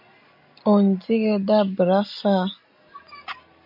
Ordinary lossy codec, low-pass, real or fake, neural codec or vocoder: MP3, 48 kbps; 5.4 kHz; real; none